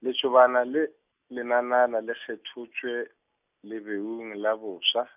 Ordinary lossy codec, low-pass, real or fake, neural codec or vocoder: none; 3.6 kHz; real; none